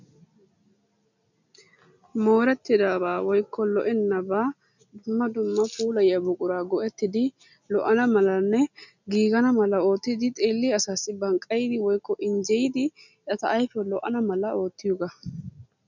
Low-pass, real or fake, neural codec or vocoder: 7.2 kHz; real; none